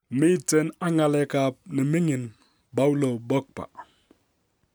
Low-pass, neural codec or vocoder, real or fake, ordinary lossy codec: none; none; real; none